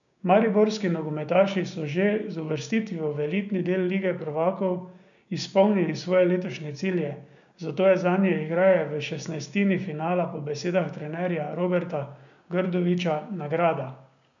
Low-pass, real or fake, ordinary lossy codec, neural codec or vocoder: 7.2 kHz; fake; none; codec, 16 kHz, 6 kbps, DAC